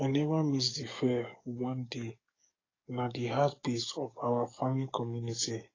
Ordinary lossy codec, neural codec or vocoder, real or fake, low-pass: AAC, 32 kbps; codec, 44.1 kHz, 7.8 kbps, DAC; fake; 7.2 kHz